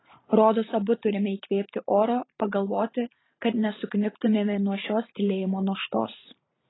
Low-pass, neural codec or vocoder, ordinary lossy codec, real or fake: 7.2 kHz; vocoder, 44.1 kHz, 128 mel bands every 512 samples, BigVGAN v2; AAC, 16 kbps; fake